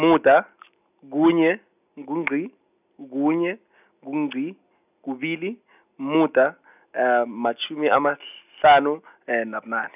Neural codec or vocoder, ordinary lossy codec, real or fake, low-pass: none; none; real; 3.6 kHz